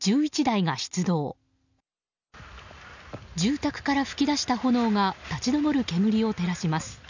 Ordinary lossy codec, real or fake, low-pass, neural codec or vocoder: none; real; 7.2 kHz; none